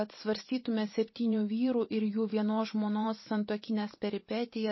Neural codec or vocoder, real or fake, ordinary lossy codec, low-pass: vocoder, 44.1 kHz, 128 mel bands every 512 samples, BigVGAN v2; fake; MP3, 24 kbps; 7.2 kHz